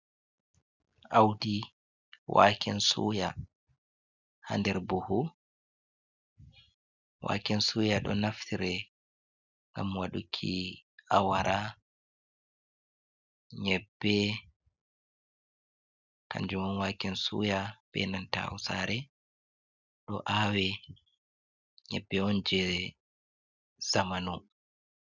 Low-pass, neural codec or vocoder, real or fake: 7.2 kHz; none; real